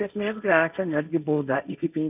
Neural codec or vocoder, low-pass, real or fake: codec, 16 kHz, 1.1 kbps, Voila-Tokenizer; 3.6 kHz; fake